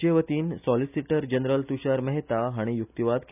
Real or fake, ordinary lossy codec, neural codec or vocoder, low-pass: real; none; none; 3.6 kHz